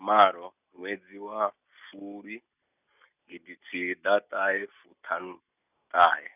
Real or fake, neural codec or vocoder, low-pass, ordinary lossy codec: real; none; 3.6 kHz; none